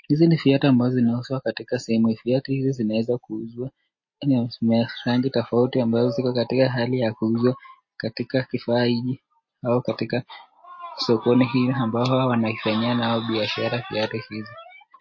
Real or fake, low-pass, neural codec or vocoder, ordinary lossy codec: real; 7.2 kHz; none; MP3, 32 kbps